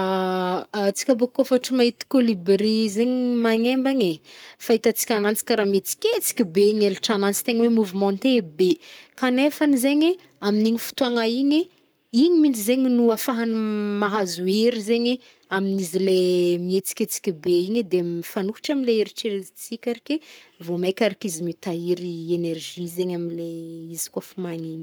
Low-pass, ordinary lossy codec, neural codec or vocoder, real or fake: none; none; codec, 44.1 kHz, 7.8 kbps, Pupu-Codec; fake